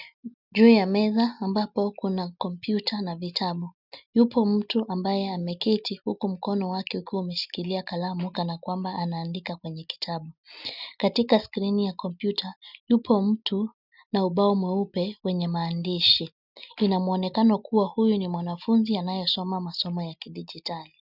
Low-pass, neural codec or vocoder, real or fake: 5.4 kHz; none; real